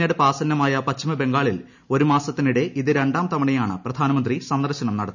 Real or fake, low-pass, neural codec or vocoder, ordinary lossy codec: real; 7.2 kHz; none; none